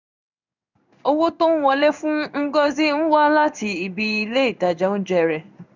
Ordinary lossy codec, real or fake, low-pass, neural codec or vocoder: none; fake; 7.2 kHz; codec, 16 kHz in and 24 kHz out, 1 kbps, XY-Tokenizer